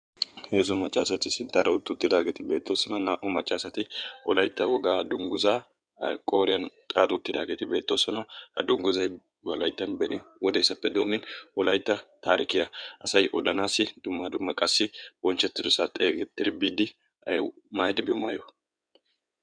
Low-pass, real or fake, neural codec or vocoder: 9.9 kHz; fake; codec, 16 kHz in and 24 kHz out, 2.2 kbps, FireRedTTS-2 codec